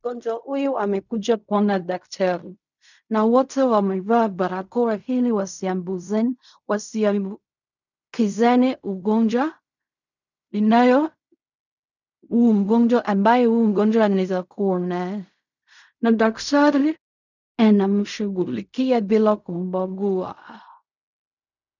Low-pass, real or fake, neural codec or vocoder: 7.2 kHz; fake; codec, 16 kHz in and 24 kHz out, 0.4 kbps, LongCat-Audio-Codec, fine tuned four codebook decoder